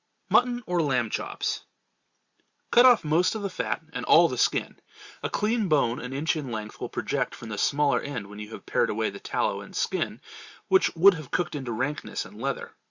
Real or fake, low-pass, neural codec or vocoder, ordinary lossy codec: real; 7.2 kHz; none; Opus, 64 kbps